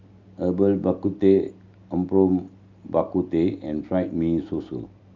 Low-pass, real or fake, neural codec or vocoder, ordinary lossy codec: 7.2 kHz; real; none; Opus, 24 kbps